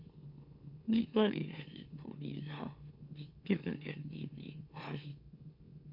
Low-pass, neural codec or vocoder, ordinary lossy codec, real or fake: 5.4 kHz; autoencoder, 44.1 kHz, a latent of 192 numbers a frame, MeloTTS; Opus, 64 kbps; fake